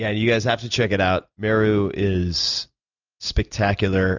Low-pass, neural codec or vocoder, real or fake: 7.2 kHz; none; real